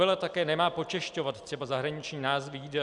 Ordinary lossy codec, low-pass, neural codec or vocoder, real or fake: Opus, 64 kbps; 10.8 kHz; none; real